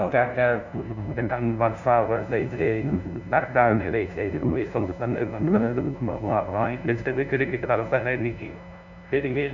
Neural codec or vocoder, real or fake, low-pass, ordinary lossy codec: codec, 16 kHz, 0.5 kbps, FunCodec, trained on LibriTTS, 25 frames a second; fake; 7.2 kHz; none